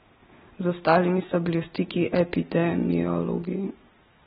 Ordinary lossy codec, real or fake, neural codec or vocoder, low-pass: AAC, 16 kbps; real; none; 7.2 kHz